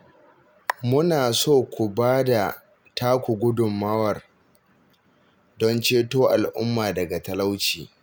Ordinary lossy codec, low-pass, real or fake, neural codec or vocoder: none; none; real; none